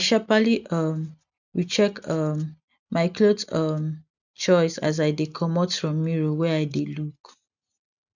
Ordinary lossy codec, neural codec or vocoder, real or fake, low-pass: none; none; real; 7.2 kHz